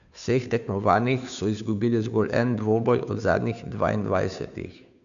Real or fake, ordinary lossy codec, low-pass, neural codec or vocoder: fake; none; 7.2 kHz; codec, 16 kHz, 2 kbps, FunCodec, trained on Chinese and English, 25 frames a second